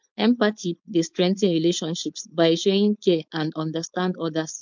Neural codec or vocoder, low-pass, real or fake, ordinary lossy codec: codec, 16 kHz, 4.8 kbps, FACodec; 7.2 kHz; fake; MP3, 64 kbps